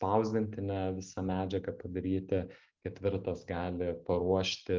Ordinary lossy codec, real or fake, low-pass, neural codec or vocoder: Opus, 24 kbps; real; 7.2 kHz; none